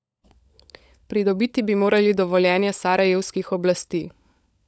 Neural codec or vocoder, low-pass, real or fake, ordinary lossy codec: codec, 16 kHz, 16 kbps, FunCodec, trained on LibriTTS, 50 frames a second; none; fake; none